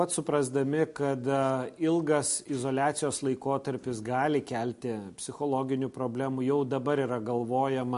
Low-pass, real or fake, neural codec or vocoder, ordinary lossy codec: 10.8 kHz; real; none; MP3, 48 kbps